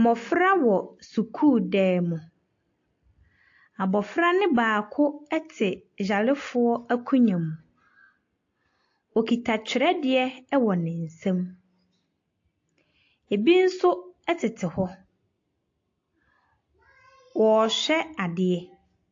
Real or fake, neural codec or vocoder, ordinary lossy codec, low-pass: real; none; AAC, 48 kbps; 7.2 kHz